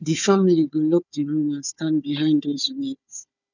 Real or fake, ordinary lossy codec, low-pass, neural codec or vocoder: fake; none; 7.2 kHz; codec, 16 kHz, 4 kbps, FunCodec, trained on Chinese and English, 50 frames a second